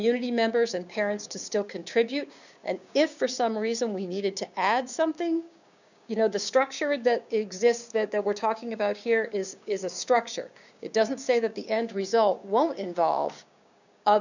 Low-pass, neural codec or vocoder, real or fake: 7.2 kHz; codec, 16 kHz, 6 kbps, DAC; fake